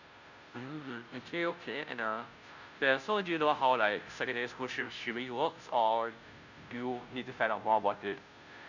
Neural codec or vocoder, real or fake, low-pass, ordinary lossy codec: codec, 16 kHz, 0.5 kbps, FunCodec, trained on Chinese and English, 25 frames a second; fake; 7.2 kHz; none